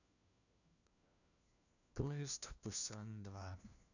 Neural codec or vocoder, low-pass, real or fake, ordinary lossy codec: codec, 16 kHz, 1 kbps, FunCodec, trained on LibriTTS, 50 frames a second; 7.2 kHz; fake; none